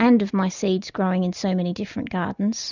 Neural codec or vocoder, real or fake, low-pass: none; real; 7.2 kHz